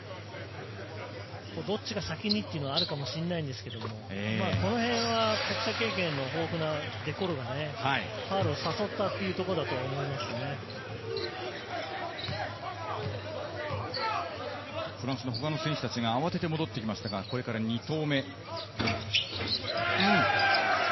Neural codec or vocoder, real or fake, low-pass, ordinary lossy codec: none; real; 7.2 kHz; MP3, 24 kbps